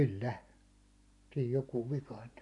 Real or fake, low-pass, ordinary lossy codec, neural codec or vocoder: real; 10.8 kHz; none; none